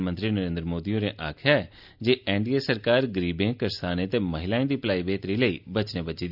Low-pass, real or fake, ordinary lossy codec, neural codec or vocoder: 5.4 kHz; real; none; none